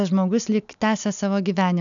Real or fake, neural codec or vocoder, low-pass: real; none; 7.2 kHz